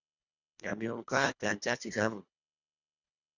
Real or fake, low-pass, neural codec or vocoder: fake; 7.2 kHz; codec, 24 kHz, 1.5 kbps, HILCodec